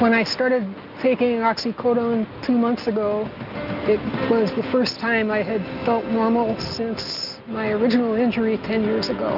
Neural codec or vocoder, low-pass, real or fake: none; 5.4 kHz; real